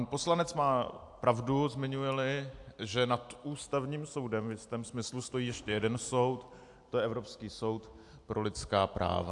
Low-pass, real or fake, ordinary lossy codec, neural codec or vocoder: 10.8 kHz; real; AAC, 64 kbps; none